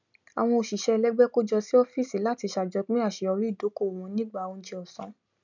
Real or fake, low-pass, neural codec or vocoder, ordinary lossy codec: real; 7.2 kHz; none; none